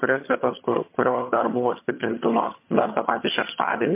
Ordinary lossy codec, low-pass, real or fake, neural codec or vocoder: MP3, 24 kbps; 3.6 kHz; fake; vocoder, 22.05 kHz, 80 mel bands, HiFi-GAN